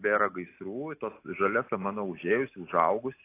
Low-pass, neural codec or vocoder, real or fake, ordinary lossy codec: 3.6 kHz; none; real; AAC, 24 kbps